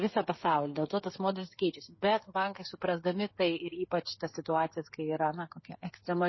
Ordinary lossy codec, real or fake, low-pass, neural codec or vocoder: MP3, 24 kbps; fake; 7.2 kHz; codec, 16 kHz, 8 kbps, FreqCodec, smaller model